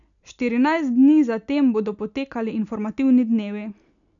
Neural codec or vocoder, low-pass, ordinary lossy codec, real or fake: none; 7.2 kHz; none; real